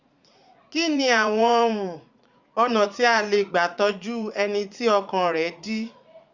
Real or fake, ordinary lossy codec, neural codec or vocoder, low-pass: fake; Opus, 64 kbps; vocoder, 44.1 kHz, 80 mel bands, Vocos; 7.2 kHz